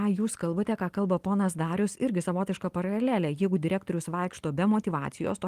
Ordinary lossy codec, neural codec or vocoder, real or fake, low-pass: Opus, 24 kbps; none; real; 14.4 kHz